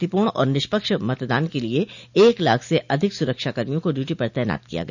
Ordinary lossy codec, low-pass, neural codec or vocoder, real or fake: none; 7.2 kHz; none; real